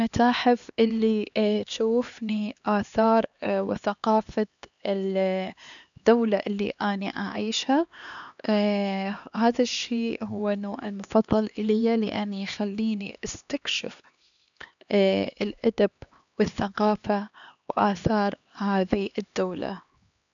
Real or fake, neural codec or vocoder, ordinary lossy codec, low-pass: fake; codec, 16 kHz, 2 kbps, X-Codec, HuBERT features, trained on LibriSpeech; none; 7.2 kHz